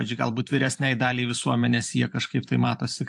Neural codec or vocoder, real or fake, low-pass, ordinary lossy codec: vocoder, 44.1 kHz, 128 mel bands every 512 samples, BigVGAN v2; fake; 10.8 kHz; AAC, 64 kbps